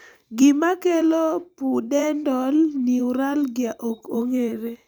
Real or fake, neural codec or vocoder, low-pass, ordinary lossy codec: fake; vocoder, 44.1 kHz, 128 mel bands every 512 samples, BigVGAN v2; none; none